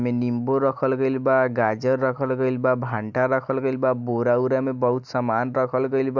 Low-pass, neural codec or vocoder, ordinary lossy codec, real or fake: 7.2 kHz; none; none; real